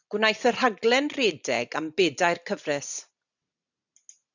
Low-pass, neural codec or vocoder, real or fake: 7.2 kHz; none; real